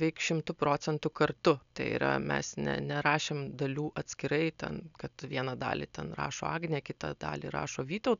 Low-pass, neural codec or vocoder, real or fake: 7.2 kHz; none; real